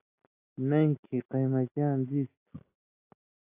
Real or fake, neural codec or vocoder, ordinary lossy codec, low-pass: real; none; AAC, 24 kbps; 3.6 kHz